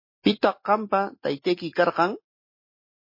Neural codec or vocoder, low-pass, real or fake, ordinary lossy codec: none; 5.4 kHz; real; MP3, 24 kbps